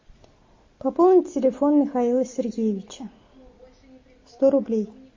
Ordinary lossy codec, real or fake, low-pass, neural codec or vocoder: MP3, 32 kbps; real; 7.2 kHz; none